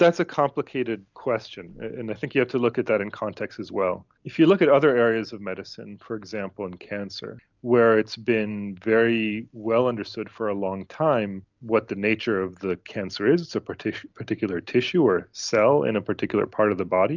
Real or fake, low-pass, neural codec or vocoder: real; 7.2 kHz; none